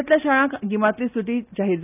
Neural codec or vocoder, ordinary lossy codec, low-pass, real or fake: none; none; 3.6 kHz; real